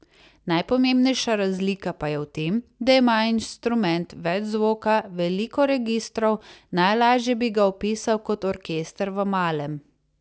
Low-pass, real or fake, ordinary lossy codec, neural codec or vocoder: none; real; none; none